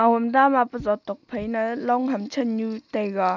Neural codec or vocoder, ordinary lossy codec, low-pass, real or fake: none; none; 7.2 kHz; real